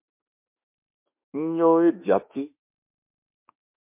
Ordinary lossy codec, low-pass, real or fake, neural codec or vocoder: MP3, 32 kbps; 3.6 kHz; fake; autoencoder, 48 kHz, 32 numbers a frame, DAC-VAE, trained on Japanese speech